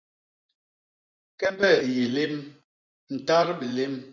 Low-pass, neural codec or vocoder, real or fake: 7.2 kHz; vocoder, 24 kHz, 100 mel bands, Vocos; fake